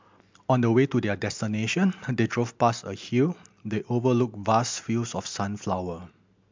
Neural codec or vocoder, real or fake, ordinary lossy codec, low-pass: none; real; MP3, 64 kbps; 7.2 kHz